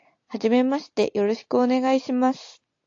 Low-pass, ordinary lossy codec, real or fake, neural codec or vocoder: 7.2 kHz; AAC, 48 kbps; real; none